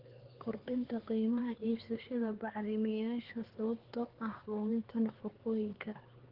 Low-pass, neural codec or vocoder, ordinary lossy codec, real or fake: 5.4 kHz; codec, 16 kHz, 4 kbps, X-Codec, HuBERT features, trained on LibriSpeech; Opus, 16 kbps; fake